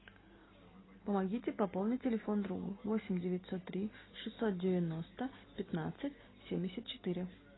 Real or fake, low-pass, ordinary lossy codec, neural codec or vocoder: real; 7.2 kHz; AAC, 16 kbps; none